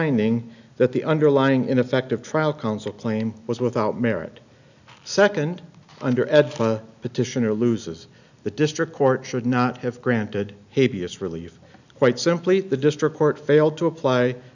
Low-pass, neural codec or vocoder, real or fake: 7.2 kHz; none; real